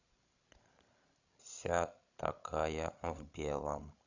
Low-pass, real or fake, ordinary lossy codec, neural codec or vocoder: 7.2 kHz; fake; none; codec, 16 kHz, 16 kbps, FreqCodec, larger model